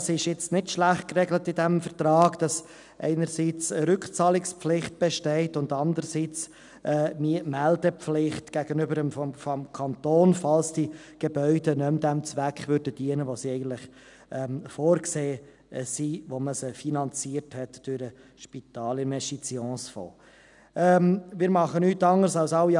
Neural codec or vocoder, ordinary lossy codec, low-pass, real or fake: none; none; 10.8 kHz; real